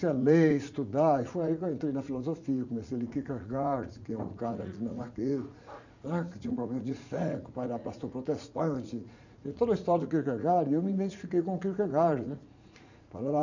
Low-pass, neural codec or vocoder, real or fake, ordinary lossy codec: 7.2 kHz; vocoder, 44.1 kHz, 80 mel bands, Vocos; fake; none